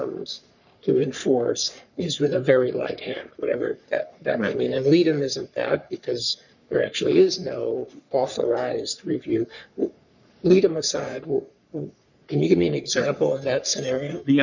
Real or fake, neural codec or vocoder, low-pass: fake; codec, 44.1 kHz, 3.4 kbps, Pupu-Codec; 7.2 kHz